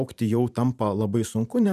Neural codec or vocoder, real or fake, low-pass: vocoder, 44.1 kHz, 128 mel bands every 512 samples, BigVGAN v2; fake; 14.4 kHz